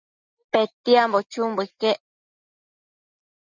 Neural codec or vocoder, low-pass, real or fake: none; 7.2 kHz; real